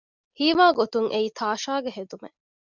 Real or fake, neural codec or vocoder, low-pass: real; none; 7.2 kHz